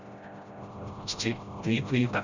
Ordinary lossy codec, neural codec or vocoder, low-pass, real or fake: none; codec, 16 kHz, 0.5 kbps, FreqCodec, smaller model; 7.2 kHz; fake